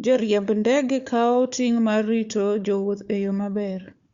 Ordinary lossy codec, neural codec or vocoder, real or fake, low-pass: Opus, 64 kbps; codec, 16 kHz, 4 kbps, FunCodec, trained on Chinese and English, 50 frames a second; fake; 7.2 kHz